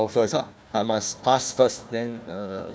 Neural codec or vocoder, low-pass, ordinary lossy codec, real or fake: codec, 16 kHz, 1 kbps, FunCodec, trained on Chinese and English, 50 frames a second; none; none; fake